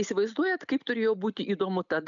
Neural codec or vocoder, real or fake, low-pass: none; real; 7.2 kHz